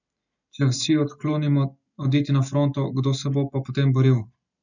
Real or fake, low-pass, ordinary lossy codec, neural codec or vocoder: real; 7.2 kHz; none; none